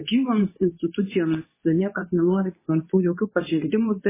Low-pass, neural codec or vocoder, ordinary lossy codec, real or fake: 3.6 kHz; codec, 24 kHz, 0.9 kbps, WavTokenizer, medium speech release version 2; MP3, 16 kbps; fake